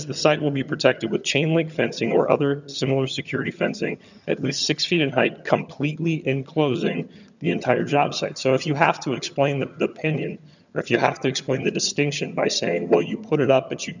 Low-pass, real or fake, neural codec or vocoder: 7.2 kHz; fake; vocoder, 22.05 kHz, 80 mel bands, HiFi-GAN